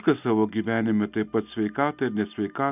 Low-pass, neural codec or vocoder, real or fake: 3.6 kHz; none; real